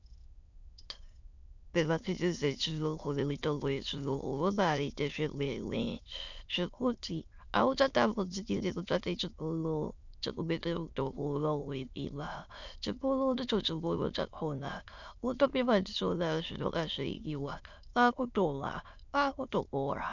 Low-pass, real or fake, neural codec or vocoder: 7.2 kHz; fake; autoencoder, 22.05 kHz, a latent of 192 numbers a frame, VITS, trained on many speakers